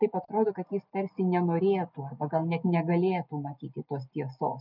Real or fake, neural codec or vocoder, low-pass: real; none; 5.4 kHz